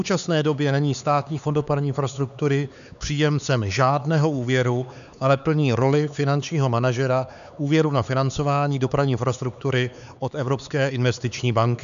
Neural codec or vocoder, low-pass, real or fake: codec, 16 kHz, 4 kbps, X-Codec, HuBERT features, trained on LibriSpeech; 7.2 kHz; fake